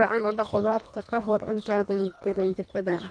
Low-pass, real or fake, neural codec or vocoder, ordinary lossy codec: 9.9 kHz; fake; codec, 24 kHz, 1.5 kbps, HILCodec; none